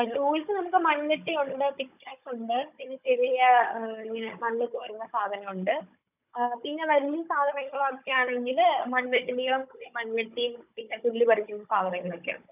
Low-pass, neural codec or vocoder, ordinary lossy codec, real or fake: 3.6 kHz; codec, 16 kHz, 16 kbps, FunCodec, trained on Chinese and English, 50 frames a second; none; fake